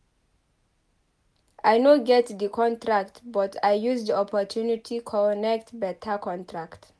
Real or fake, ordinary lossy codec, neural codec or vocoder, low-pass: real; none; none; none